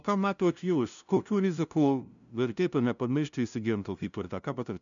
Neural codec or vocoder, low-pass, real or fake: codec, 16 kHz, 0.5 kbps, FunCodec, trained on LibriTTS, 25 frames a second; 7.2 kHz; fake